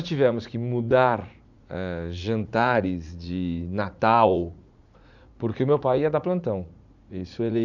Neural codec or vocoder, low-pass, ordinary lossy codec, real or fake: vocoder, 44.1 kHz, 80 mel bands, Vocos; 7.2 kHz; none; fake